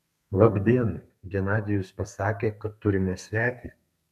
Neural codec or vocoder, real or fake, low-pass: codec, 32 kHz, 1.9 kbps, SNAC; fake; 14.4 kHz